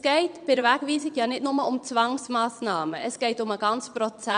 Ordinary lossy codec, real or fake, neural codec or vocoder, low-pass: none; fake; vocoder, 22.05 kHz, 80 mel bands, Vocos; 9.9 kHz